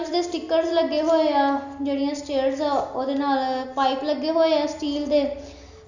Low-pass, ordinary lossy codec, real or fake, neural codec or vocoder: 7.2 kHz; none; real; none